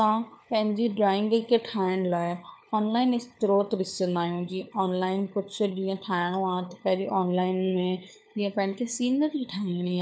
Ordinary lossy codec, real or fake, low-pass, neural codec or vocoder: none; fake; none; codec, 16 kHz, 4 kbps, FunCodec, trained on LibriTTS, 50 frames a second